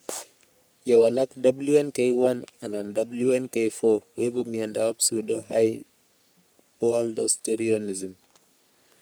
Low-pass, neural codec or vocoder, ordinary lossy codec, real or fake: none; codec, 44.1 kHz, 3.4 kbps, Pupu-Codec; none; fake